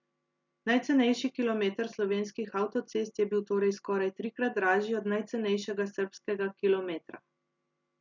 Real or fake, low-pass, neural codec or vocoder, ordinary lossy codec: real; 7.2 kHz; none; none